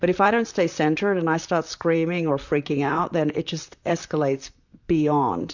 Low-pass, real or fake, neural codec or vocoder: 7.2 kHz; fake; vocoder, 44.1 kHz, 128 mel bands, Pupu-Vocoder